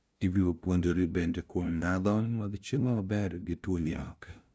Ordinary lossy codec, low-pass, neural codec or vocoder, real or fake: none; none; codec, 16 kHz, 0.5 kbps, FunCodec, trained on LibriTTS, 25 frames a second; fake